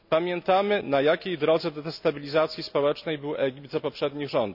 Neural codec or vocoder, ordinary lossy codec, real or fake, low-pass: none; none; real; 5.4 kHz